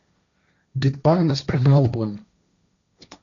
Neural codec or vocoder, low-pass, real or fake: codec, 16 kHz, 1.1 kbps, Voila-Tokenizer; 7.2 kHz; fake